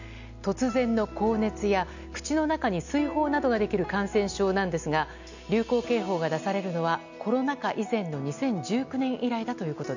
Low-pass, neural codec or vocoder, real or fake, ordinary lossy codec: 7.2 kHz; none; real; none